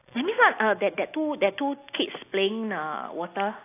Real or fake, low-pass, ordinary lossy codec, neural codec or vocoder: real; 3.6 kHz; AAC, 24 kbps; none